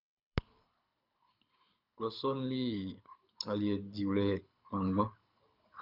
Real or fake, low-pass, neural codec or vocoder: fake; 5.4 kHz; codec, 24 kHz, 6 kbps, HILCodec